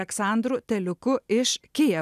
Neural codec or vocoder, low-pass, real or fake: none; 14.4 kHz; real